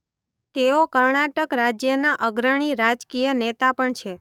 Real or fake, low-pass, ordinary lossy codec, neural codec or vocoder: fake; 19.8 kHz; none; codec, 44.1 kHz, 7.8 kbps, DAC